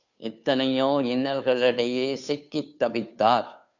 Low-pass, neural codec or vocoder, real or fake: 7.2 kHz; codec, 16 kHz, 2 kbps, FunCodec, trained on Chinese and English, 25 frames a second; fake